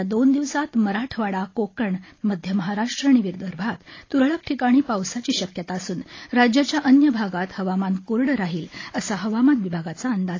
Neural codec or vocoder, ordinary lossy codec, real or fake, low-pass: none; AAC, 32 kbps; real; 7.2 kHz